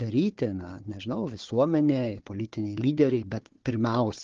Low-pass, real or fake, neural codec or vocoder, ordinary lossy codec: 7.2 kHz; fake; codec, 16 kHz, 4 kbps, FunCodec, trained on Chinese and English, 50 frames a second; Opus, 16 kbps